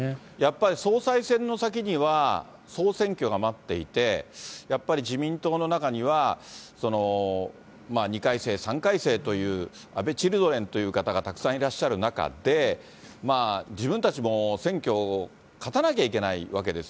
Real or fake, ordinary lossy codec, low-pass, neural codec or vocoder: real; none; none; none